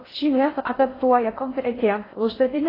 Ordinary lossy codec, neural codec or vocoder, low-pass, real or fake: AAC, 24 kbps; codec, 16 kHz in and 24 kHz out, 0.6 kbps, FocalCodec, streaming, 4096 codes; 5.4 kHz; fake